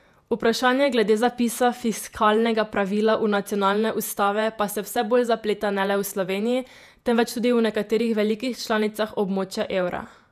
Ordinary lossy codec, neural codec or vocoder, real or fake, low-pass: none; vocoder, 48 kHz, 128 mel bands, Vocos; fake; 14.4 kHz